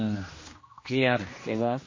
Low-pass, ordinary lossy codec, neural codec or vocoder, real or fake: 7.2 kHz; MP3, 32 kbps; codec, 16 kHz, 1 kbps, X-Codec, HuBERT features, trained on general audio; fake